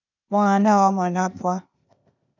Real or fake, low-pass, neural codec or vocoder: fake; 7.2 kHz; codec, 16 kHz, 0.8 kbps, ZipCodec